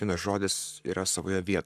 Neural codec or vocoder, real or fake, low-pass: codec, 44.1 kHz, 7.8 kbps, Pupu-Codec; fake; 14.4 kHz